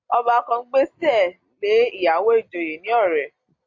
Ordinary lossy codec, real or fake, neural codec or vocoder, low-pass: AAC, 48 kbps; real; none; 7.2 kHz